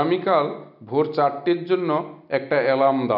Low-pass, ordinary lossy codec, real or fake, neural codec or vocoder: 5.4 kHz; none; real; none